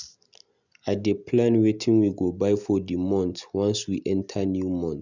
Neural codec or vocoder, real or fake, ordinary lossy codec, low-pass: none; real; none; 7.2 kHz